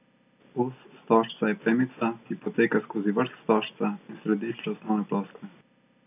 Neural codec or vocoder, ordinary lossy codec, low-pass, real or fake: none; none; 3.6 kHz; real